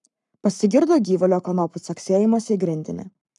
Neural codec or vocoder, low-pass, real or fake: codec, 44.1 kHz, 7.8 kbps, Pupu-Codec; 10.8 kHz; fake